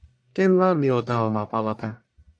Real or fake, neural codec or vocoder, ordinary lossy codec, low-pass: fake; codec, 44.1 kHz, 1.7 kbps, Pupu-Codec; AAC, 64 kbps; 9.9 kHz